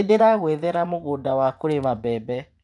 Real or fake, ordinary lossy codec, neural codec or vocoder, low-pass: fake; none; vocoder, 24 kHz, 100 mel bands, Vocos; 10.8 kHz